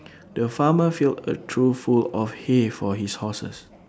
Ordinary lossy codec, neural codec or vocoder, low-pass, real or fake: none; none; none; real